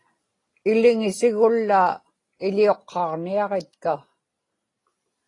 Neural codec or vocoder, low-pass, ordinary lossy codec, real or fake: none; 10.8 kHz; AAC, 32 kbps; real